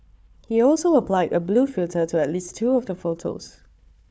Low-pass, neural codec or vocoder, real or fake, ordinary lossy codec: none; codec, 16 kHz, 4 kbps, FunCodec, trained on Chinese and English, 50 frames a second; fake; none